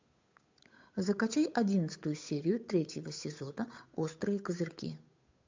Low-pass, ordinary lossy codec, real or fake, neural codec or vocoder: 7.2 kHz; MP3, 64 kbps; fake; codec, 16 kHz, 8 kbps, FunCodec, trained on Chinese and English, 25 frames a second